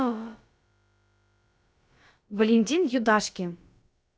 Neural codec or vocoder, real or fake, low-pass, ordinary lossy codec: codec, 16 kHz, about 1 kbps, DyCAST, with the encoder's durations; fake; none; none